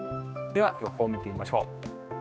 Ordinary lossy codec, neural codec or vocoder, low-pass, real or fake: none; codec, 16 kHz, 2 kbps, X-Codec, HuBERT features, trained on general audio; none; fake